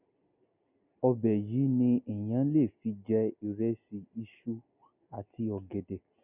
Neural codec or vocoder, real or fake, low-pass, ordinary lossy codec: none; real; 3.6 kHz; none